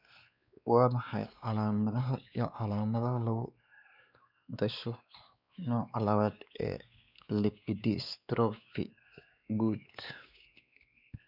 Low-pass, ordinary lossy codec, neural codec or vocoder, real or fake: 5.4 kHz; none; codec, 16 kHz, 2 kbps, X-Codec, WavLM features, trained on Multilingual LibriSpeech; fake